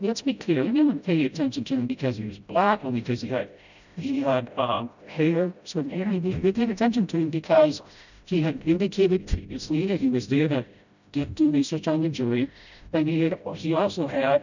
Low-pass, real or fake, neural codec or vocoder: 7.2 kHz; fake; codec, 16 kHz, 0.5 kbps, FreqCodec, smaller model